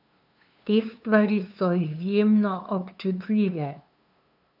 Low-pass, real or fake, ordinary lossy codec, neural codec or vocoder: 5.4 kHz; fake; none; codec, 16 kHz, 2 kbps, FunCodec, trained on LibriTTS, 25 frames a second